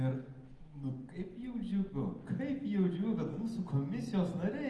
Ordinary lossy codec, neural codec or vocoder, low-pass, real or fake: Opus, 24 kbps; none; 10.8 kHz; real